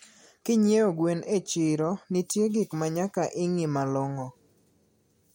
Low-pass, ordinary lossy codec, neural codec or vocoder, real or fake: 19.8 kHz; MP3, 64 kbps; none; real